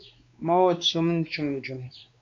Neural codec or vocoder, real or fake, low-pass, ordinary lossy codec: codec, 16 kHz, 2 kbps, X-Codec, WavLM features, trained on Multilingual LibriSpeech; fake; 7.2 kHz; AAC, 64 kbps